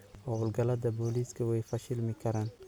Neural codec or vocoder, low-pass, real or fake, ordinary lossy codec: none; none; real; none